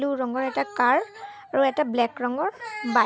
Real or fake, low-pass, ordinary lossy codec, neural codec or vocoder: real; none; none; none